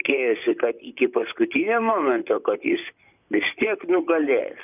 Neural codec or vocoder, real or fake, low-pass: vocoder, 44.1 kHz, 128 mel bands, Pupu-Vocoder; fake; 3.6 kHz